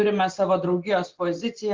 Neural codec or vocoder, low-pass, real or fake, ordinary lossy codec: none; 7.2 kHz; real; Opus, 16 kbps